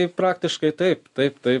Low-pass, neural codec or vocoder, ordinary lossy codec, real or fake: 10.8 kHz; none; MP3, 64 kbps; real